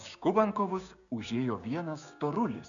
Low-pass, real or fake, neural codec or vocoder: 7.2 kHz; fake; codec, 16 kHz, 6 kbps, DAC